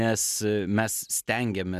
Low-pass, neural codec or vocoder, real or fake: 14.4 kHz; none; real